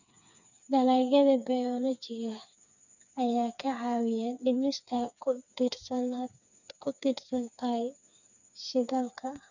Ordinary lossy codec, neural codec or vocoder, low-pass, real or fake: none; codec, 16 kHz, 4 kbps, FreqCodec, smaller model; 7.2 kHz; fake